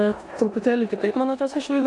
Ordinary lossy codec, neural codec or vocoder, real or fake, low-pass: AAC, 64 kbps; codec, 16 kHz in and 24 kHz out, 0.9 kbps, LongCat-Audio-Codec, four codebook decoder; fake; 10.8 kHz